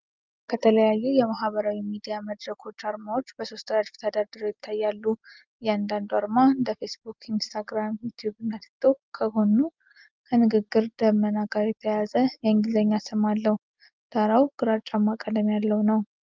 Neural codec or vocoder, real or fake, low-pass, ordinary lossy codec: none; real; 7.2 kHz; Opus, 24 kbps